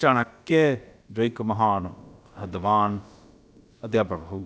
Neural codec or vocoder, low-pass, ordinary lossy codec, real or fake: codec, 16 kHz, about 1 kbps, DyCAST, with the encoder's durations; none; none; fake